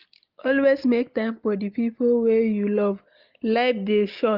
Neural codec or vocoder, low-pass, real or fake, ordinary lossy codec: none; 5.4 kHz; real; Opus, 32 kbps